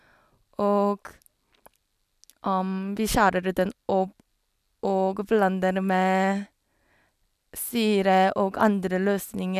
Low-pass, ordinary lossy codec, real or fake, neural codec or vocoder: 14.4 kHz; none; real; none